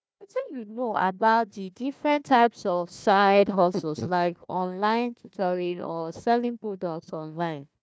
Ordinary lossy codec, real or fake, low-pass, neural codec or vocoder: none; fake; none; codec, 16 kHz, 1 kbps, FunCodec, trained on Chinese and English, 50 frames a second